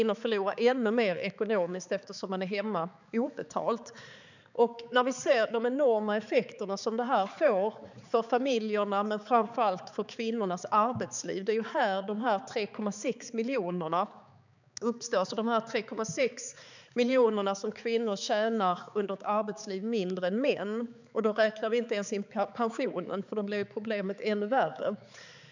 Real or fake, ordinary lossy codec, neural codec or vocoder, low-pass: fake; none; codec, 16 kHz, 4 kbps, X-Codec, HuBERT features, trained on balanced general audio; 7.2 kHz